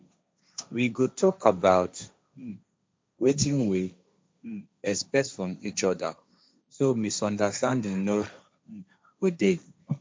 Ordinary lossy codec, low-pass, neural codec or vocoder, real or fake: none; none; codec, 16 kHz, 1.1 kbps, Voila-Tokenizer; fake